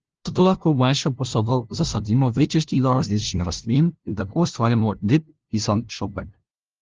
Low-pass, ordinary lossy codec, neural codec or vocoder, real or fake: 7.2 kHz; Opus, 16 kbps; codec, 16 kHz, 0.5 kbps, FunCodec, trained on LibriTTS, 25 frames a second; fake